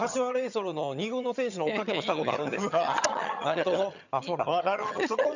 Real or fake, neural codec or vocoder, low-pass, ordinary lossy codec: fake; vocoder, 22.05 kHz, 80 mel bands, HiFi-GAN; 7.2 kHz; none